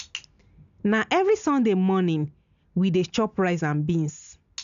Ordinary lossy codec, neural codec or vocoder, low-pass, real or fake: none; none; 7.2 kHz; real